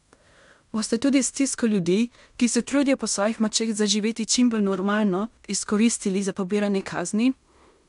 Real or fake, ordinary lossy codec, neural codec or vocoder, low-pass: fake; none; codec, 16 kHz in and 24 kHz out, 0.9 kbps, LongCat-Audio-Codec, fine tuned four codebook decoder; 10.8 kHz